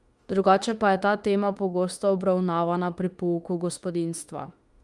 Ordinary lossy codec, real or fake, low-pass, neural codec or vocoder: Opus, 32 kbps; fake; 10.8 kHz; autoencoder, 48 kHz, 32 numbers a frame, DAC-VAE, trained on Japanese speech